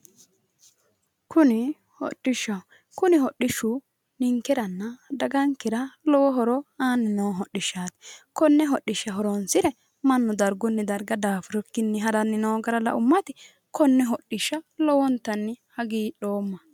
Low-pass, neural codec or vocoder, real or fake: 19.8 kHz; none; real